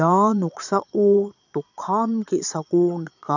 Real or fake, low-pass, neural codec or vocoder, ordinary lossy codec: fake; 7.2 kHz; vocoder, 22.05 kHz, 80 mel bands, Vocos; none